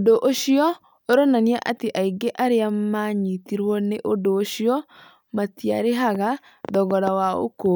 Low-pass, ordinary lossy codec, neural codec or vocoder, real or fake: none; none; none; real